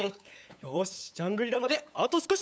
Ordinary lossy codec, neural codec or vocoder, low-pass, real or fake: none; codec, 16 kHz, 8 kbps, FunCodec, trained on LibriTTS, 25 frames a second; none; fake